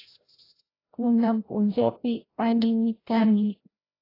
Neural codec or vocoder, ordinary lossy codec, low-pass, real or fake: codec, 16 kHz, 0.5 kbps, FreqCodec, larger model; AAC, 24 kbps; 5.4 kHz; fake